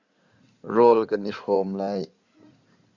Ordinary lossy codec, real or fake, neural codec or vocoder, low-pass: Opus, 64 kbps; fake; codec, 16 kHz in and 24 kHz out, 2.2 kbps, FireRedTTS-2 codec; 7.2 kHz